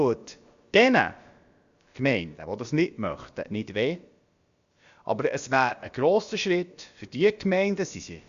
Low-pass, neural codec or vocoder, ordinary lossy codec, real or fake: 7.2 kHz; codec, 16 kHz, about 1 kbps, DyCAST, with the encoder's durations; Opus, 64 kbps; fake